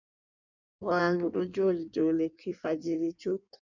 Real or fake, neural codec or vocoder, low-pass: fake; codec, 16 kHz in and 24 kHz out, 1.1 kbps, FireRedTTS-2 codec; 7.2 kHz